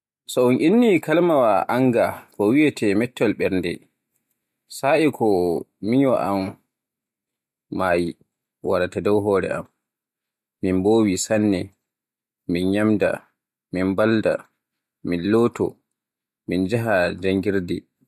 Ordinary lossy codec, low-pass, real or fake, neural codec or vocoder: MP3, 64 kbps; 14.4 kHz; real; none